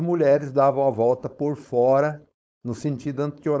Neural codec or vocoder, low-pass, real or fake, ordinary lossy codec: codec, 16 kHz, 4.8 kbps, FACodec; none; fake; none